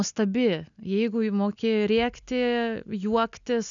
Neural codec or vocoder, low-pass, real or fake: none; 7.2 kHz; real